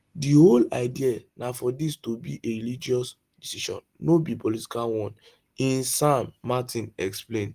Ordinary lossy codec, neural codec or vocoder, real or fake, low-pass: Opus, 24 kbps; none; real; 14.4 kHz